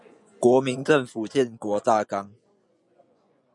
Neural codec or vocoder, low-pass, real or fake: vocoder, 24 kHz, 100 mel bands, Vocos; 10.8 kHz; fake